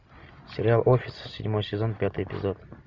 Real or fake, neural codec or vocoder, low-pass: real; none; 7.2 kHz